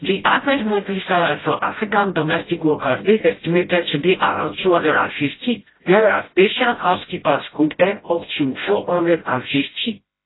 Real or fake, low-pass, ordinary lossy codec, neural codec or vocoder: fake; 7.2 kHz; AAC, 16 kbps; codec, 16 kHz, 0.5 kbps, FreqCodec, smaller model